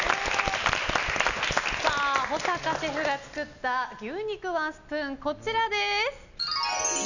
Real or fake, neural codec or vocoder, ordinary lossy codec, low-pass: real; none; none; 7.2 kHz